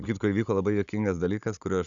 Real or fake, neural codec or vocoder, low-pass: fake; codec, 16 kHz, 16 kbps, FunCodec, trained on Chinese and English, 50 frames a second; 7.2 kHz